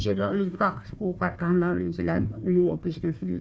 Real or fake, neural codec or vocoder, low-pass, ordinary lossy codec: fake; codec, 16 kHz, 1 kbps, FunCodec, trained on Chinese and English, 50 frames a second; none; none